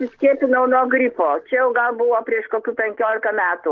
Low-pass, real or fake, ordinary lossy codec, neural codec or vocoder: 7.2 kHz; real; Opus, 16 kbps; none